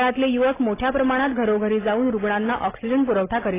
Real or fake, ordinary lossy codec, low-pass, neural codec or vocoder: real; AAC, 16 kbps; 3.6 kHz; none